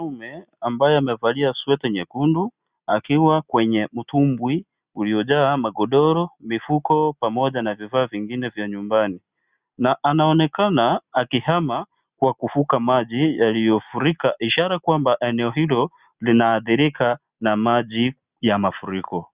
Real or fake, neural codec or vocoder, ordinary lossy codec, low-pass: real; none; Opus, 64 kbps; 3.6 kHz